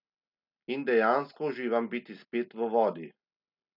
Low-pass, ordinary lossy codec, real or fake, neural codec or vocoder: 5.4 kHz; none; real; none